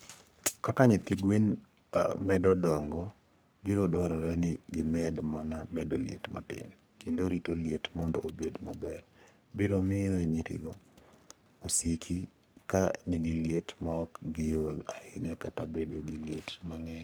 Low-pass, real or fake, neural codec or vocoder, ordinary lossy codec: none; fake; codec, 44.1 kHz, 3.4 kbps, Pupu-Codec; none